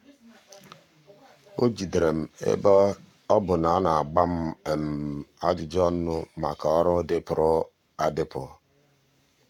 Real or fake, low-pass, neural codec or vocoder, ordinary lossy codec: fake; 19.8 kHz; codec, 44.1 kHz, 7.8 kbps, Pupu-Codec; none